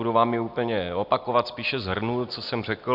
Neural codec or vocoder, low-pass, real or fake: none; 5.4 kHz; real